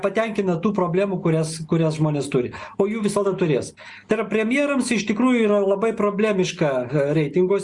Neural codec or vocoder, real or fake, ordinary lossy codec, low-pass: none; real; Opus, 64 kbps; 10.8 kHz